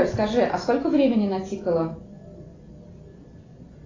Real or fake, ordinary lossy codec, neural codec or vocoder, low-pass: real; AAC, 32 kbps; none; 7.2 kHz